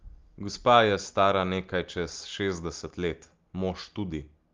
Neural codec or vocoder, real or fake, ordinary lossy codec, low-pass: none; real; Opus, 32 kbps; 7.2 kHz